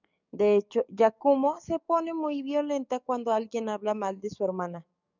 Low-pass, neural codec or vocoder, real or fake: 7.2 kHz; codec, 44.1 kHz, 7.8 kbps, DAC; fake